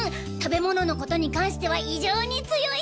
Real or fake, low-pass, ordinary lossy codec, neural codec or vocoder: real; none; none; none